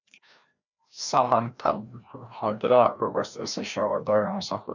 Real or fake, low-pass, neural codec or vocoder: fake; 7.2 kHz; codec, 16 kHz, 1 kbps, FreqCodec, larger model